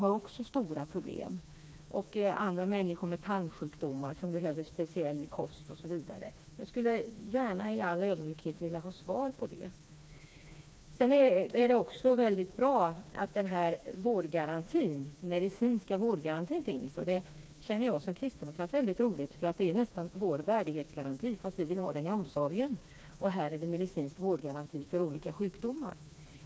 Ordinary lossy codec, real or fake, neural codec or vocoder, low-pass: none; fake; codec, 16 kHz, 2 kbps, FreqCodec, smaller model; none